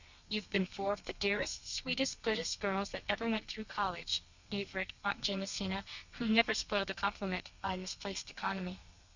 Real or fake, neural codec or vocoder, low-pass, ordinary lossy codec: fake; codec, 32 kHz, 1.9 kbps, SNAC; 7.2 kHz; Opus, 64 kbps